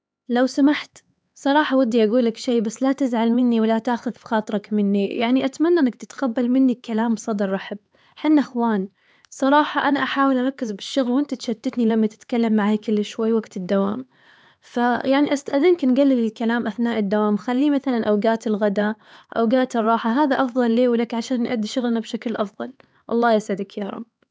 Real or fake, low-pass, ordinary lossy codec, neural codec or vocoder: fake; none; none; codec, 16 kHz, 4 kbps, X-Codec, HuBERT features, trained on LibriSpeech